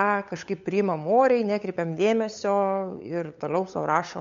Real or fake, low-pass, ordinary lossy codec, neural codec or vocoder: fake; 7.2 kHz; MP3, 48 kbps; codec, 16 kHz, 8 kbps, FunCodec, trained on LibriTTS, 25 frames a second